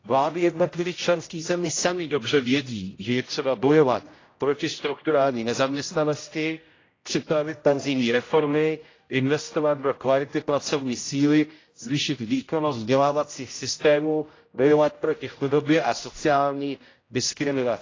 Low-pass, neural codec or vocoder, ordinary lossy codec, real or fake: 7.2 kHz; codec, 16 kHz, 0.5 kbps, X-Codec, HuBERT features, trained on general audio; AAC, 32 kbps; fake